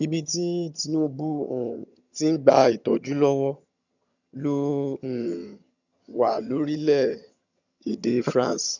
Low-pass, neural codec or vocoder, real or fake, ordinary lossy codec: 7.2 kHz; vocoder, 22.05 kHz, 80 mel bands, HiFi-GAN; fake; none